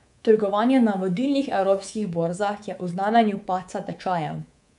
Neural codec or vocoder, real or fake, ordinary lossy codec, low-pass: codec, 24 kHz, 3.1 kbps, DualCodec; fake; none; 10.8 kHz